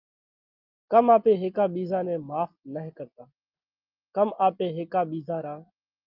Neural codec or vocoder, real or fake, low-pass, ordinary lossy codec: none; real; 5.4 kHz; Opus, 24 kbps